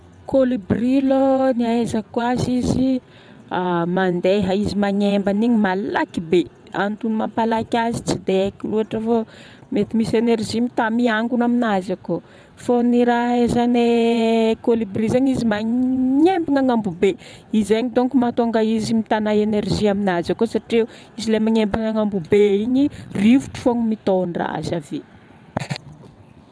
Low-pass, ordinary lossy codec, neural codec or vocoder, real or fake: none; none; vocoder, 22.05 kHz, 80 mel bands, WaveNeXt; fake